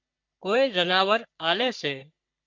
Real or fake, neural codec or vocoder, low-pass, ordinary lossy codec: fake; codec, 44.1 kHz, 3.4 kbps, Pupu-Codec; 7.2 kHz; MP3, 64 kbps